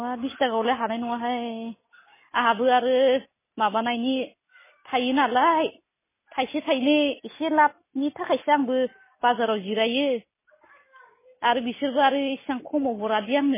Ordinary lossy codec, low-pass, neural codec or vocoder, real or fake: MP3, 16 kbps; 3.6 kHz; none; real